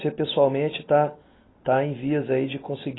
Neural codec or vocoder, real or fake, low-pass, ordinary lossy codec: none; real; 7.2 kHz; AAC, 16 kbps